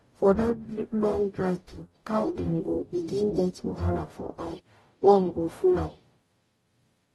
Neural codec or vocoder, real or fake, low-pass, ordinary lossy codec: codec, 44.1 kHz, 0.9 kbps, DAC; fake; 19.8 kHz; AAC, 32 kbps